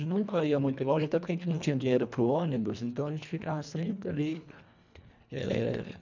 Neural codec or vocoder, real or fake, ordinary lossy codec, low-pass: codec, 24 kHz, 1.5 kbps, HILCodec; fake; none; 7.2 kHz